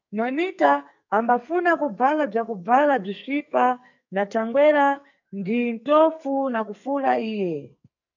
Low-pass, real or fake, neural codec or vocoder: 7.2 kHz; fake; codec, 44.1 kHz, 2.6 kbps, SNAC